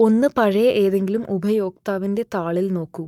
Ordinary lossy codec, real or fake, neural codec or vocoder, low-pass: none; fake; codec, 44.1 kHz, 7.8 kbps, Pupu-Codec; 19.8 kHz